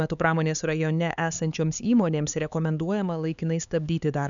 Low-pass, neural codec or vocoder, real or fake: 7.2 kHz; codec, 16 kHz, 4 kbps, X-Codec, HuBERT features, trained on LibriSpeech; fake